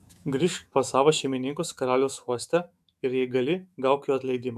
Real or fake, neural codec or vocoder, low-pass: fake; autoencoder, 48 kHz, 128 numbers a frame, DAC-VAE, trained on Japanese speech; 14.4 kHz